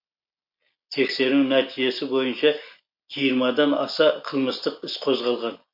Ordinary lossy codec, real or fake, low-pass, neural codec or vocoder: MP3, 32 kbps; real; 5.4 kHz; none